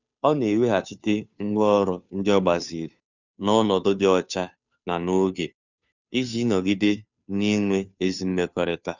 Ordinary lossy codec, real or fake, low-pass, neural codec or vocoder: none; fake; 7.2 kHz; codec, 16 kHz, 2 kbps, FunCodec, trained on Chinese and English, 25 frames a second